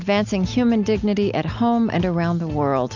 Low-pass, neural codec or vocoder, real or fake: 7.2 kHz; none; real